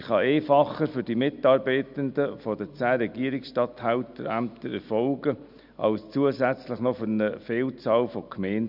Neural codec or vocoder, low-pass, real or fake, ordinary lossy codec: none; 5.4 kHz; real; none